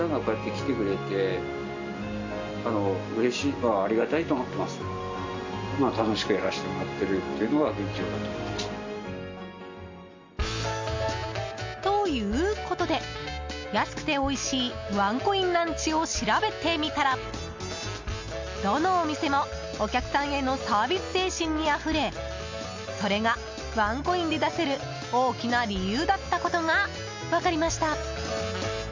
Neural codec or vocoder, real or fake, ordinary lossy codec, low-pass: none; real; MP3, 64 kbps; 7.2 kHz